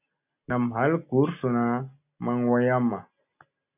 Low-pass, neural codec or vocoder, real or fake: 3.6 kHz; none; real